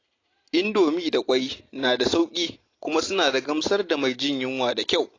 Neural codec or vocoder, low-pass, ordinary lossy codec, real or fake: none; 7.2 kHz; AAC, 32 kbps; real